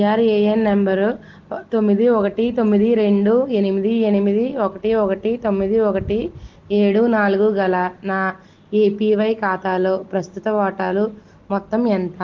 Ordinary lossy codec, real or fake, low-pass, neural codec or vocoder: Opus, 16 kbps; real; 7.2 kHz; none